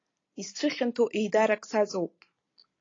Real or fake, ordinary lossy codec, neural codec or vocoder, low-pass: real; AAC, 32 kbps; none; 7.2 kHz